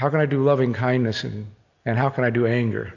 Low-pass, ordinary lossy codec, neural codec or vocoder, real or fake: 7.2 kHz; AAC, 48 kbps; none; real